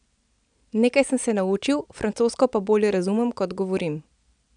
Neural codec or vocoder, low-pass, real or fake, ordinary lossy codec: none; 9.9 kHz; real; none